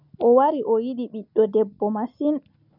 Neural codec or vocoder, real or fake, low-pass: none; real; 5.4 kHz